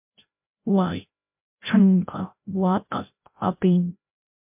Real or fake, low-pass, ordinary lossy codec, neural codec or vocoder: fake; 3.6 kHz; MP3, 32 kbps; codec, 16 kHz, 0.5 kbps, FreqCodec, larger model